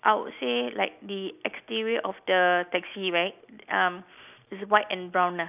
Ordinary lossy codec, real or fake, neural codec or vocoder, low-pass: none; real; none; 3.6 kHz